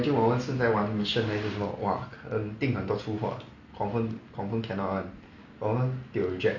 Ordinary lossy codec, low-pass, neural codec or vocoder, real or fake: none; 7.2 kHz; none; real